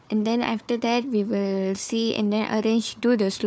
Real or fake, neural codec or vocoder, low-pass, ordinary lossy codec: fake; codec, 16 kHz, 4 kbps, FunCodec, trained on Chinese and English, 50 frames a second; none; none